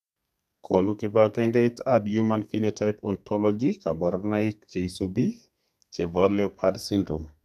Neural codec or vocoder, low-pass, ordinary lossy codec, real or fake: codec, 32 kHz, 1.9 kbps, SNAC; 14.4 kHz; none; fake